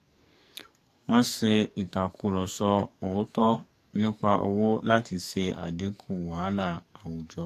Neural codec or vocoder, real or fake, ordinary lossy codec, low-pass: codec, 44.1 kHz, 2.6 kbps, SNAC; fake; AAC, 64 kbps; 14.4 kHz